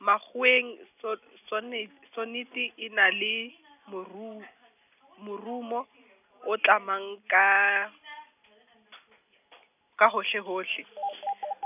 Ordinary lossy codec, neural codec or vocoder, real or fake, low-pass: none; none; real; 3.6 kHz